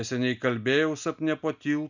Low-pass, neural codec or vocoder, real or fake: 7.2 kHz; none; real